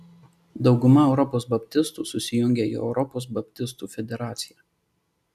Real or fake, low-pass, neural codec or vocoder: real; 14.4 kHz; none